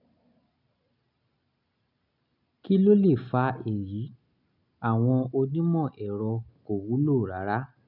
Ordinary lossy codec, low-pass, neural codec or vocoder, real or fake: none; 5.4 kHz; none; real